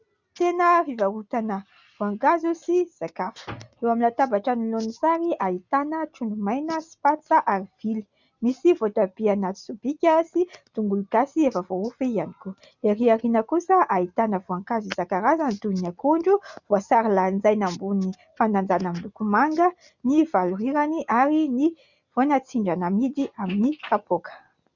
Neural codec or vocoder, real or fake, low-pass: none; real; 7.2 kHz